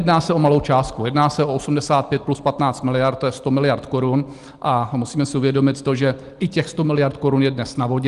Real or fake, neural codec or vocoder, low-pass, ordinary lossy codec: real; none; 10.8 kHz; Opus, 24 kbps